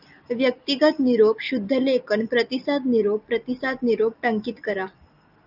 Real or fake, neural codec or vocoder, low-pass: real; none; 5.4 kHz